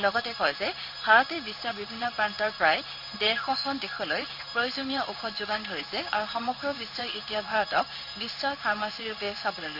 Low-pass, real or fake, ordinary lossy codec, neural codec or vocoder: 5.4 kHz; fake; none; codec, 16 kHz in and 24 kHz out, 1 kbps, XY-Tokenizer